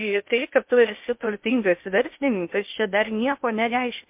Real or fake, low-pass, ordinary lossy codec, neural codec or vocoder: fake; 3.6 kHz; MP3, 32 kbps; codec, 16 kHz in and 24 kHz out, 0.6 kbps, FocalCodec, streaming, 2048 codes